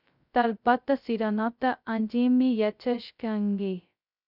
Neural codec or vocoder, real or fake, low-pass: codec, 16 kHz, 0.2 kbps, FocalCodec; fake; 5.4 kHz